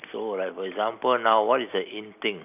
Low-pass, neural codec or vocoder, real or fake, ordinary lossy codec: 3.6 kHz; none; real; none